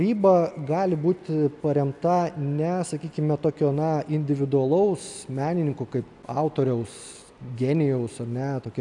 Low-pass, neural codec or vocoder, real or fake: 10.8 kHz; none; real